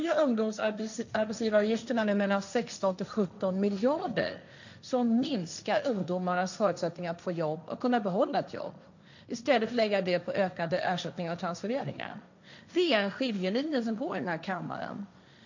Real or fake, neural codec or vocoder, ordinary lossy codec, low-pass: fake; codec, 16 kHz, 1.1 kbps, Voila-Tokenizer; none; 7.2 kHz